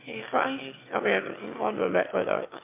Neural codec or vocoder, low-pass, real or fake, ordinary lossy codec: autoencoder, 22.05 kHz, a latent of 192 numbers a frame, VITS, trained on one speaker; 3.6 kHz; fake; MP3, 32 kbps